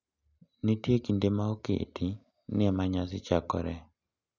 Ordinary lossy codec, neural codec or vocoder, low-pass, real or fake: none; none; 7.2 kHz; real